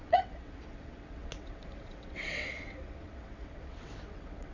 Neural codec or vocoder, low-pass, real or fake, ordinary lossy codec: vocoder, 22.05 kHz, 80 mel bands, WaveNeXt; 7.2 kHz; fake; none